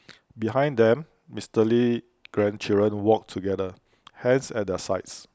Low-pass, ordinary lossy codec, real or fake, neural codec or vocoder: none; none; real; none